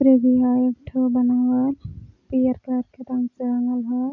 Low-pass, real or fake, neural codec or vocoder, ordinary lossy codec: 7.2 kHz; real; none; none